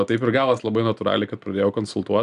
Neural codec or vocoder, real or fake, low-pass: none; real; 10.8 kHz